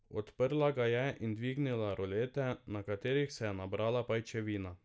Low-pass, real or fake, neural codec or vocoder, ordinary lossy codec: none; real; none; none